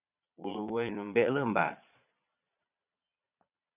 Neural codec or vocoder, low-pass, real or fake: vocoder, 22.05 kHz, 80 mel bands, Vocos; 3.6 kHz; fake